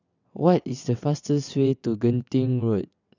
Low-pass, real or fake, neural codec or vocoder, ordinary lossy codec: 7.2 kHz; fake; vocoder, 22.05 kHz, 80 mel bands, WaveNeXt; none